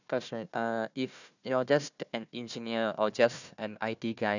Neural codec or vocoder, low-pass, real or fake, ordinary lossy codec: codec, 16 kHz, 1 kbps, FunCodec, trained on Chinese and English, 50 frames a second; 7.2 kHz; fake; none